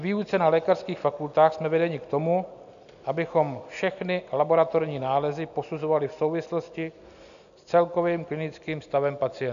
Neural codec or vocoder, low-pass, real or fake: none; 7.2 kHz; real